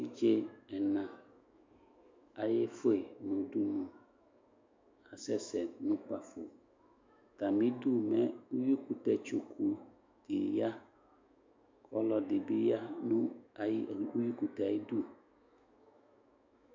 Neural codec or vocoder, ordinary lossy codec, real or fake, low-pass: autoencoder, 48 kHz, 128 numbers a frame, DAC-VAE, trained on Japanese speech; MP3, 64 kbps; fake; 7.2 kHz